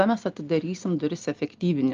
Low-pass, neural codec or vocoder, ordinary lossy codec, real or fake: 7.2 kHz; none; Opus, 32 kbps; real